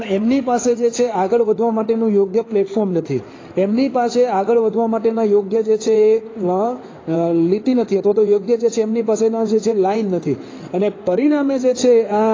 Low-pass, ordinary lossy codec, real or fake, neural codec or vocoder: 7.2 kHz; AAC, 32 kbps; fake; codec, 16 kHz in and 24 kHz out, 2.2 kbps, FireRedTTS-2 codec